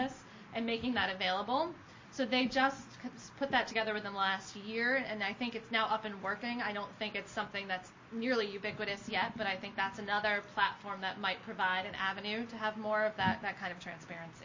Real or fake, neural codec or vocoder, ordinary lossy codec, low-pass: real; none; MP3, 32 kbps; 7.2 kHz